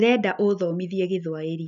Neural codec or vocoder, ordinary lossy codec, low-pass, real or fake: none; none; 7.2 kHz; real